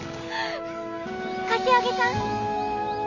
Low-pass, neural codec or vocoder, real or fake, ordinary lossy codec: 7.2 kHz; none; real; none